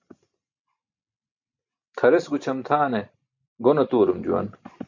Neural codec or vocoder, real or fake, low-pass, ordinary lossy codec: none; real; 7.2 kHz; MP3, 64 kbps